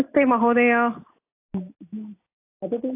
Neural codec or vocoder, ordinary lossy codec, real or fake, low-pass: none; AAC, 24 kbps; real; 3.6 kHz